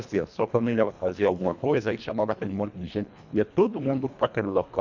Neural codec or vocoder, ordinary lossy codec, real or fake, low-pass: codec, 24 kHz, 1.5 kbps, HILCodec; none; fake; 7.2 kHz